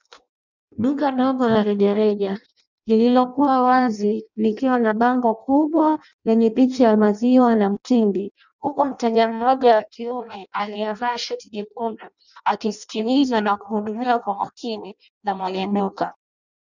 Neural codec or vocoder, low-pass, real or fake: codec, 16 kHz in and 24 kHz out, 0.6 kbps, FireRedTTS-2 codec; 7.2 kHz; fake